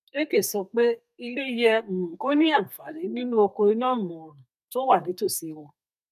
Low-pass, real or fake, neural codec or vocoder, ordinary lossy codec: 14.4 kHz; fake; codec, 32 kHz, 1.9 kbps, SNAC; none